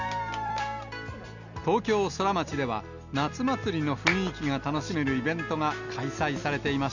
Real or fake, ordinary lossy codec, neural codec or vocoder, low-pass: real; none; none; 7.2 kHz